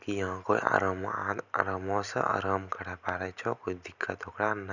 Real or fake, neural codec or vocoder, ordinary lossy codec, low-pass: real; none; none; 7.2 kHz